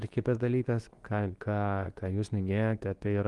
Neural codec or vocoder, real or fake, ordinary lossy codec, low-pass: codec, 24 kHz, 0.9 kbps, WavTokenizer, medium speech release version 2; fake; Opus, 24 kbps; 10.8 kHz